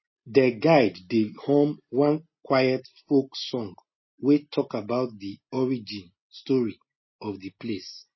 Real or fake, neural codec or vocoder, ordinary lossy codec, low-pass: real; none; MP3, 24 kbps; 7.2 kHz